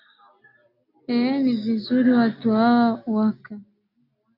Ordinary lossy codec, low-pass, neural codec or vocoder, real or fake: AAC, 32 kbps; 5.4 kHz; none; real